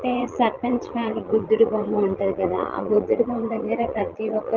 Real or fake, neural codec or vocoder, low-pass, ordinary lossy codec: fake; vocoder, 22.05 kHz, 80 mel bands, Vocos; 7.2 kHz; Opus, 32 kbps